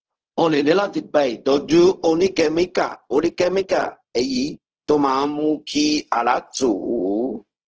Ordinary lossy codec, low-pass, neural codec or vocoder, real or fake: Opus, 16 kbps; 7.2 kHz; codec, 16 kHz, 0.4 kbps, LongCat-Audio-Codec; fake